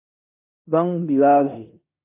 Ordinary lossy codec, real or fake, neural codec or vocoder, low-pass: AAC, 32 kbps; fake; codec, 16 kHz in and 24 kHz out, 0.9 kbps, LongCat-Audio-Codec, four codebook decoder; 3.6 kHz